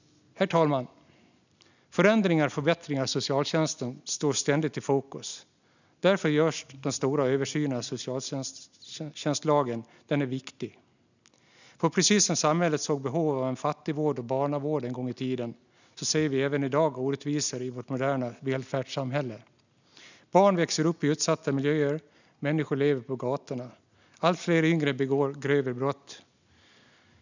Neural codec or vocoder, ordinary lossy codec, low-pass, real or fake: none; none; 7.2 kHz; real